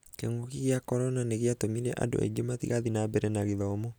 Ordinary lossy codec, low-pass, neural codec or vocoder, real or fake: none; none; none; real